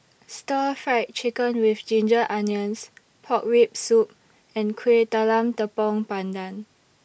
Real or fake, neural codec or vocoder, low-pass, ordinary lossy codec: real; none; none; none